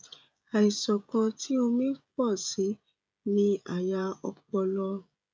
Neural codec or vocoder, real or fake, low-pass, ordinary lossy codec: codec, 16 kHz, 16 kbps, FreqCodec, smaller model; fake; none; none